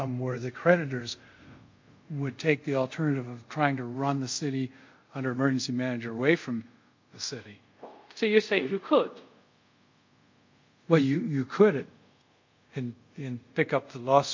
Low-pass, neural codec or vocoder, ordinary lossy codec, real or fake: 7.2 kHz; codec, 24 kHz, 0.5 kbps, DualCodec; MP3, 48 kbps; fake